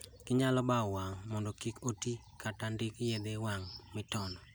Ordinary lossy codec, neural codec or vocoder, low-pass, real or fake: none; none; none; real